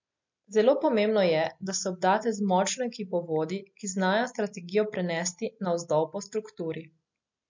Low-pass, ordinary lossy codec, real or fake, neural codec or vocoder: 7.2 kHz; MP3, 48 kbps; real; none